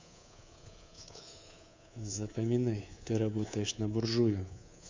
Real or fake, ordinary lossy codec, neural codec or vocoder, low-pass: fake; MP3, 64 kbps; codec, 24 kHz, 3.1 kbps, DualCodec; 7.2 kHz